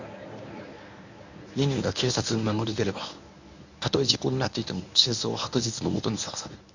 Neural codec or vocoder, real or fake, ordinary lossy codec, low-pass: codec, 24 kHz, 0.9 kbps, WavTokenizer, medium speech release version 1; fake; none; 7.2 kHz